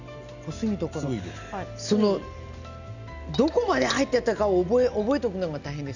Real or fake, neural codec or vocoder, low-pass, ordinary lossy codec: real; none; 7.2 kHz; none